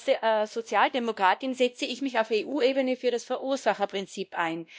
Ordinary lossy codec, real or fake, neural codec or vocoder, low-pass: none; fake; codec, 16 kHz, 1 kbps, X-Codec, WavLM features, trained on Multilingual LibriSpeech; none